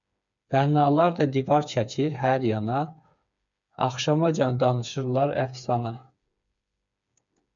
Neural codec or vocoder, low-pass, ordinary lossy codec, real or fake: codec, 16 kHz, 4 kbps, FreqCodec, smaller model; 7.2 kHz; MP3, 96 kbps; fake